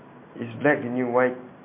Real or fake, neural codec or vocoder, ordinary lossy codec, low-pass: real; none; MP3, 24 kbps; 3.6 kHz